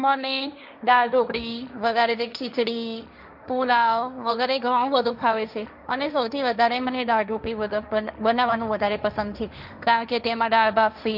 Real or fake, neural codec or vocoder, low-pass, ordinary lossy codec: fake; codec, 16 kHz, 1.1 kbps, Voila-Tokenizer; 5.4 kHz; none